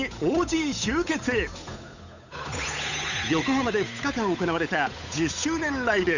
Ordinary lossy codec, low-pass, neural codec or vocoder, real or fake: none; 7.2 kHz; codec, 16 kHz, 8 kbps, FunCodec, trained on Chinese and English, 25 frames a second; fake